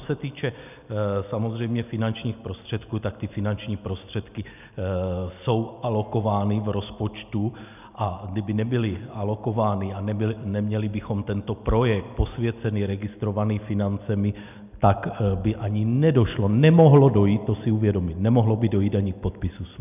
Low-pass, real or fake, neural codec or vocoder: 3.6 kHz; real; none